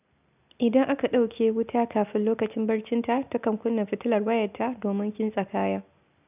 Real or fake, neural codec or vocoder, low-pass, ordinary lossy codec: real; none; 3.6 kHz; none